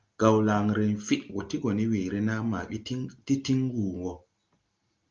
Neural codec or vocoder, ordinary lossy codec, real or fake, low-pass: none; Opus, 24 kbps; real; 7.2 kHz